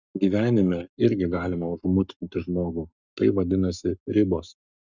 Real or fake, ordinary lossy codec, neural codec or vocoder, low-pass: fake; Opus, 64 kbps; codec, 44.1 kHz, 7.8 kbps, Pupu-Codec; 7.2 kHz